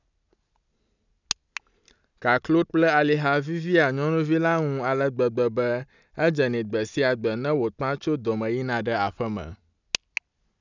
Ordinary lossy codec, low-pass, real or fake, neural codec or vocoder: none; 7.2 kHz; real; none